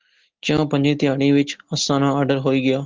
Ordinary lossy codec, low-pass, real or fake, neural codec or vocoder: Opus, 16 kbps; 7.2 kHz; real; none